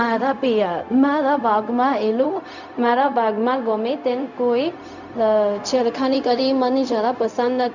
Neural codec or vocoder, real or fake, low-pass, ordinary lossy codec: codec, 16 kHz, 0.4 kbps, LongCat-Audio-Codec; fake; 7.2 kHz; none